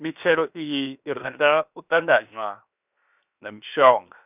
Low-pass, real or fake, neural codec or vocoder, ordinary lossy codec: 3.6 kHz; fake; codec, 16 kHz, 0.7 kbps, FocalCodec; none